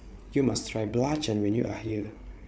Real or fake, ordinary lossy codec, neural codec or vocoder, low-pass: fake; none; codec, 16 kHz, 8 kbps, FreqCodec, larger model; none